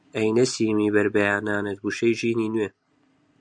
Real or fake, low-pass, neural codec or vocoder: real; 9.9 kHz; none